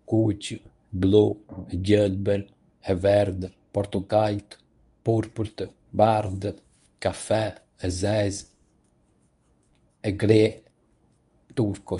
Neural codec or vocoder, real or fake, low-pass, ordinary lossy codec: codec, 24 kHz, 0.9 kbps, WavTokenizer, medium speech release version 1; fake; 10.8 kHz; none